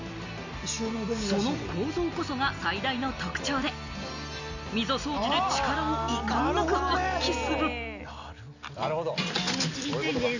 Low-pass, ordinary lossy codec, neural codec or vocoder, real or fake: 7.2 kHz; none; none; real